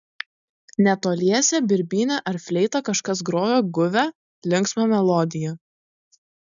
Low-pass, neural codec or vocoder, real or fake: 7.2 kHz; none; real